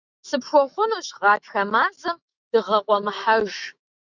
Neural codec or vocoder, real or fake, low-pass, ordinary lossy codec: autoencoder, 48 kHz, 128 numbers a frame, DAC-VAE, trained on Japanese speech; fake; 7.2 kHz; Opus, 64 kbps